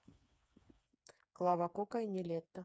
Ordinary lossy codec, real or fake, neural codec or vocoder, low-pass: none; fake; codec, 16 kHz, 4 kbps, FreqCodec, smaller model; none